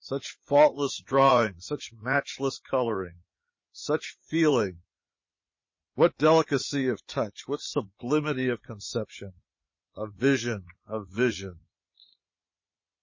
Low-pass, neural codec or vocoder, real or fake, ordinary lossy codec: 7.2 kHz; vocoder, 44.1 kHz, 80 mel bands, Vocos; fake; MP3, 32 kbps